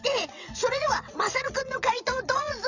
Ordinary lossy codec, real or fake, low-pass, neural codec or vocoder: none; fake; 7.2 kHz; vocoder, 22.05 kHz, 80 mel bands, WaveNeXt